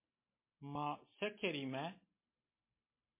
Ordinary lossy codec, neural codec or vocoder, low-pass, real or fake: MP3, 24 kbps; none; 3.6 kHz; real